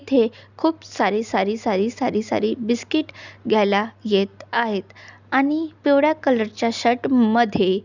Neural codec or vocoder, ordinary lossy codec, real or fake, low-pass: none; none; real; 7.2 kHz